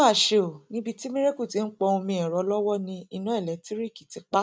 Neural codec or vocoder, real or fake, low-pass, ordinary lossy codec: none; real; none; none